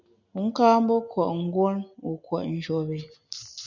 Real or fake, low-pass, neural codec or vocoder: real; 7.2 kHz; none